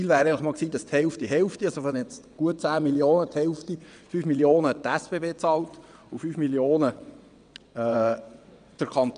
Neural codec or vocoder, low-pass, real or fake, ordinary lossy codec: vocoder, 22.05 kHz, 80 mel bands, WaveNeXt; 9.9 kHz; fake; none